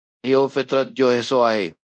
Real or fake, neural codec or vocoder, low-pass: fake; codec, 24 kHz, 0.5 kbps, DualCodec; 9.9 kHz